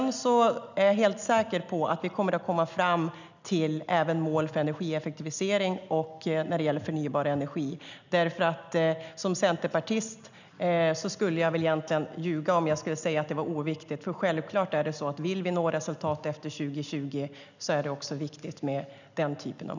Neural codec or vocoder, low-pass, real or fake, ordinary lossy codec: none; 7.2 kHz; real; none